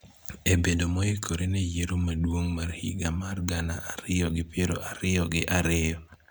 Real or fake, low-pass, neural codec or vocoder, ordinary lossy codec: real; none; none; none